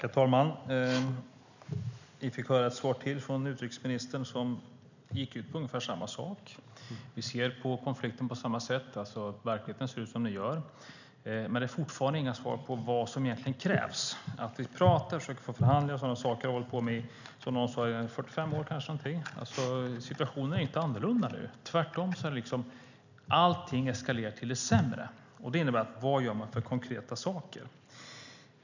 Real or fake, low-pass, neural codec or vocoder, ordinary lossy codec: real; 7.2 kHz; none; none